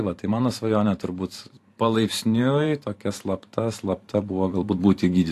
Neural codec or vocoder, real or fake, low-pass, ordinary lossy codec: vocoder, 44.1 kHz, 128 mel bands every 512 samples, BigVGAN v2; fake; 14.4 kHz; AAC, 64 kbps